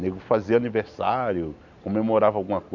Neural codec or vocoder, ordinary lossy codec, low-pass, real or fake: none; none; 7.2 kHz; real